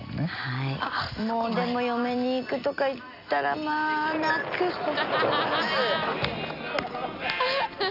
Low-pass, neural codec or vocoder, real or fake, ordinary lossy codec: 5.4 kHz; none; real; none